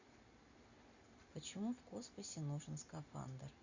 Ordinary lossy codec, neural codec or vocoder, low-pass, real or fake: Opus, 64 kbps; none; 7.2 kHz; real